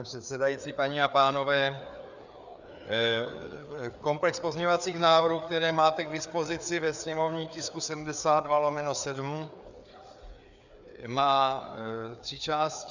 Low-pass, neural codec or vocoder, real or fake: 7.2 kHz; codec, 16 kHz, 4 kbps, FreqCodec, larger model; fake